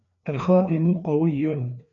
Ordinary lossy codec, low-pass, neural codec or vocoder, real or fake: MP3, 64 kbps; 7.2 kHz; codec, 16 kHz, 2 kbps, FreqCodec, larger model; fake